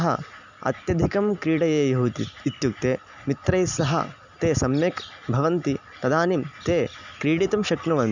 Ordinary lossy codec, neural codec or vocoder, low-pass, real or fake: none; none; 7.2 kHz; real